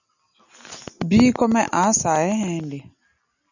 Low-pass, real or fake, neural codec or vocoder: 7.2 kHz; real; none